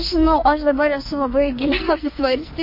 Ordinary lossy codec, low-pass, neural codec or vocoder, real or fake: MP3, 32 kbps; 5.4 kHz; codec, 44.1 kHz, 2.6 kbps, SNAC; fake